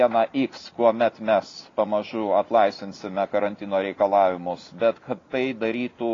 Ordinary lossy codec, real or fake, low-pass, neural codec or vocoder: AAC, 32 kbps; real; 7.2 kHz; none